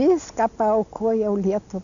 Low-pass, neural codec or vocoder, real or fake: 7.2 kHz; none; real